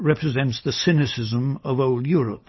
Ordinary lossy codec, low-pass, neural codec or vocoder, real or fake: MP3, 24 kbps; 7.2 kHz; none; real